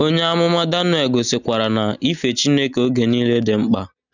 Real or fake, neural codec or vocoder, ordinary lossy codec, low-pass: real; none; none; 7.2 kHz